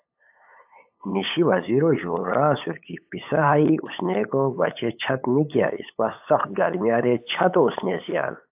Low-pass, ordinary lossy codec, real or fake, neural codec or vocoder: 3.6 kHz; AAC, 32 kbps; fake; codec, 16 kHz, 8 kbps, FunCodec, trained on LibriTTS, 25 frames a second